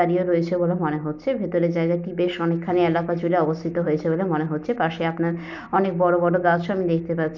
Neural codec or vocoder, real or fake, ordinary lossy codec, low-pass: none; real; none; 7.2 kHz